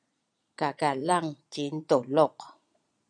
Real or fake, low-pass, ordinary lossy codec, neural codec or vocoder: fake; 9.9 kHz; MP3, 96 kbps; vocoder, 22.05 kHz, 80 mel bands, Vocos